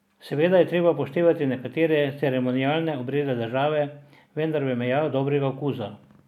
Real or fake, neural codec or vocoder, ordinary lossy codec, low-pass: real; none; none; 19.8 kHz